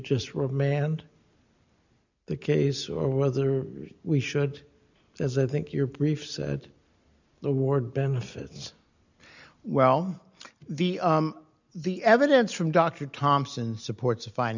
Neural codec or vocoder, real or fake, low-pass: none; real; 7.2 kHz